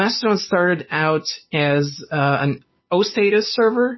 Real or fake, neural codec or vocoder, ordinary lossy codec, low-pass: real; none; MP3, 24 kbps; 7.2 kHz